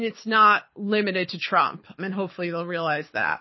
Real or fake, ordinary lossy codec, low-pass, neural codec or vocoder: fake; MP3, 24 kbps; 7.2 kHz; codec, 24 kHz, 6 kbps, HILCodec